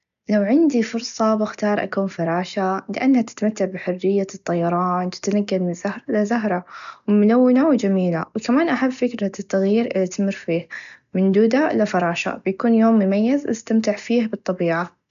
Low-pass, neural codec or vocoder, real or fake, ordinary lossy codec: 7.2 kHz; none; real; none